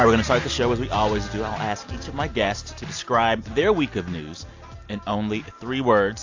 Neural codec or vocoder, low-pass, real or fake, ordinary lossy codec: none; 7.2 kHz; real; AAC, 48 kbps